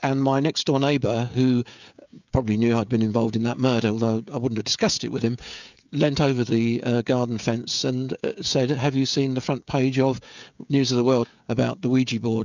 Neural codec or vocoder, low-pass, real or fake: codec, 16 kHz, 16 kbps, FreqCodec, smaller model; 7.2 kHz; fake